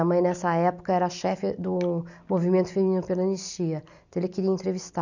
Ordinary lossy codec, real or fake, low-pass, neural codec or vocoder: none; real; 7.2 kHz; none